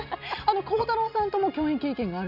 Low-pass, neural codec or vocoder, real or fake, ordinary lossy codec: 5.4 kHz; none; real; AAC, 48 kbps